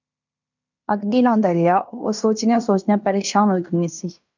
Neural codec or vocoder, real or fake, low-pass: codec, 16 kHz in and 24 kHz out, 0.9 kbps, LongCat-Audio-Codec, fine tuned four codebook decoder; fake; 7.2 kHz